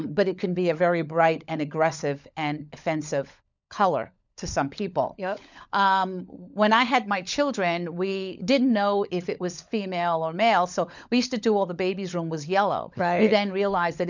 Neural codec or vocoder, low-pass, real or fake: codec, 16 kHz, 16 kbps, FunCodec, trained on LibriTTS, 50 frames a second; 7.2 kHz; fake